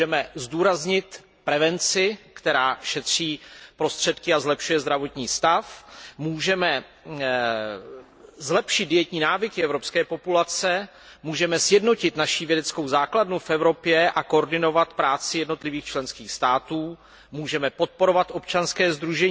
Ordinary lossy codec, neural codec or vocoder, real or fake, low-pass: none; none; real; none